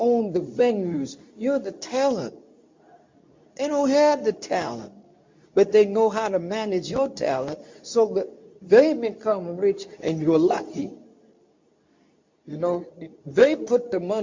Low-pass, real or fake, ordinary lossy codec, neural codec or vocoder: 7.2 kHz; fake; MP3, 48 kbps; codec, 24 kHz, 0.9 kbps, WavTokenizer, medium speech release version 2